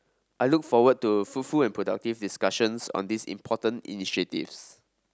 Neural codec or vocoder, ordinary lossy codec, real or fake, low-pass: none; none; real; none